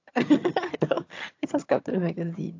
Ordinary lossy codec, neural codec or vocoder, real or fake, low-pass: AAC, 48 kbps; vocoder, 22.05 kHz, 80 mel bands, HiFi-GAN; fake; 7.2 kHz